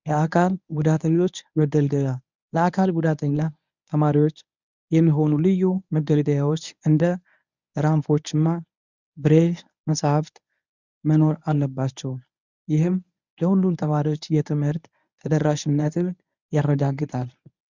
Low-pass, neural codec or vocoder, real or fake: 7.2 kHz; codec, 24 kHz, 0.9 kbps, WavTokenizer, medium speech release version 1; fake